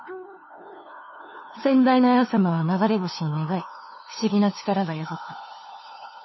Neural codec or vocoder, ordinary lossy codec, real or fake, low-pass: codec, 16 kHz, 2 kbps, FunCodec, trained on LibriTTS, 25 frames a second; MP3, 24 kbps; fake; 7.2 kHz